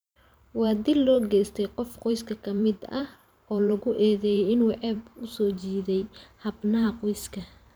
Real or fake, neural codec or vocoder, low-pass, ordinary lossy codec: fake; vocoder, 44.1 kHz, 128 mel bands every 512 samples, BigVGAN v2; none; none